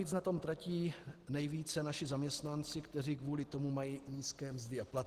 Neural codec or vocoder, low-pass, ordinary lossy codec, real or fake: none; 14.4 kHz; Opus, 16 kbps; real